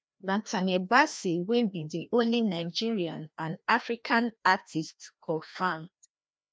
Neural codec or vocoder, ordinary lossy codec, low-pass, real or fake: codec, 16 kHz, 1 kbps, FreqCodec, larger model; none; none; fake